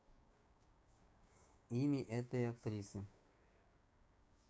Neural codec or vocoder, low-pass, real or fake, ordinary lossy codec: codec, 16 kHz, 6 kbps, DAC; none; fake; none